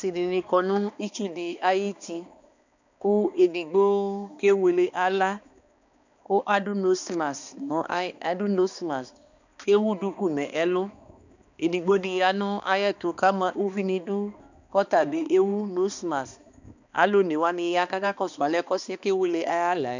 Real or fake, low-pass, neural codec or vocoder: fake; 7.2 kHz; codec, 16 kHz, 2 kbps, X-Codec, HuBERT features, trained on balanced general audio